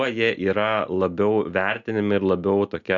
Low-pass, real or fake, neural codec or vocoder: 7.2 kHz; real; none